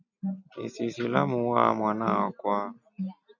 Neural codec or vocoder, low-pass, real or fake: none; 7.2 kHz; real